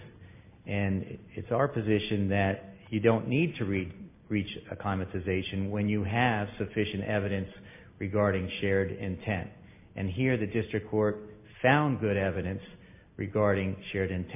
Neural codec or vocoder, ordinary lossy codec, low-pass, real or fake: none; MP3, 24 kbps; 3.6 kHz; real